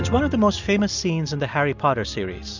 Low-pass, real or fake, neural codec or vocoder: 7.2 kHz; real; none